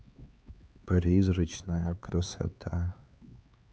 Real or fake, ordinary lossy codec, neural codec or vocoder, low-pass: fake; none; codec, 16 kHz, 4 kbps, X-Codec, HuBERT features, trained on LibriSpeech; none